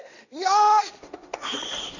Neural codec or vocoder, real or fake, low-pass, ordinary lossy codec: codec, 16 kHz, 1.1 kbps, Voila-Tokenizer; fake; 7.2 kHz; none